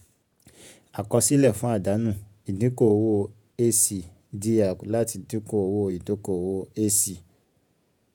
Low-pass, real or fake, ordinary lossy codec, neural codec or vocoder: none; fake; none; vocoder, 48 kHz, 128 mel bands, Vocos